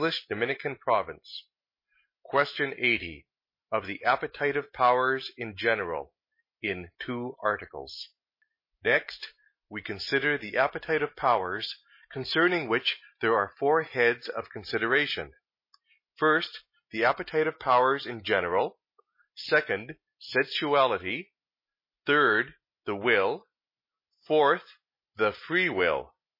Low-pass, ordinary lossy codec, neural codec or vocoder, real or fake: 5.4 kHz; MP3, 24 kbps; none; real